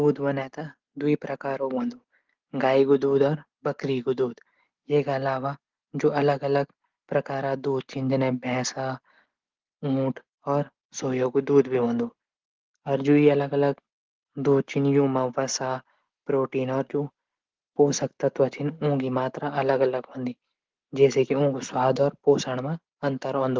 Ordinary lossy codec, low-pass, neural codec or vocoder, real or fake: Opus, 16 kbps; 7.2 kHz; none; real